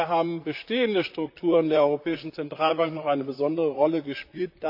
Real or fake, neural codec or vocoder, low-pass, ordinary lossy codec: fake; vocoder, 44.1 kHz, 128 mel bands, Pupu-Vocoder; 5.4 kHz; AAC, 48 kbps